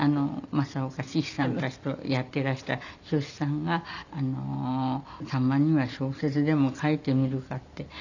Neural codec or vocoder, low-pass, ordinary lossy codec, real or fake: none; 7.2 kHz; none; real